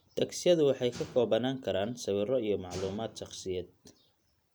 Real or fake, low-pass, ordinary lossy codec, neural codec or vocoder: real; none; none; none